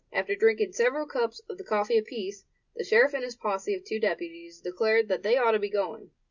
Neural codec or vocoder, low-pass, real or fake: none; 7.2 kHz; real